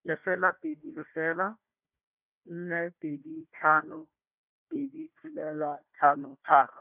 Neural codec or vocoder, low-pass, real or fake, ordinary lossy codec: codec, 16 kHz, 1 kbps, FunCodec, trained on Chinese and English, 50 frames a second; 3.6 kHz; fake; none